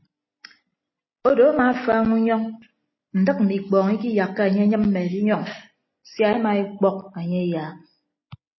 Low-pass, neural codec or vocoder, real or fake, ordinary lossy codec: 7.2 kHz; none; real; MP3, 24 kbps